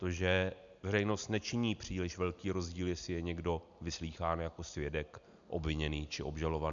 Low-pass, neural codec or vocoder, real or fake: 7.2 kHz; none; real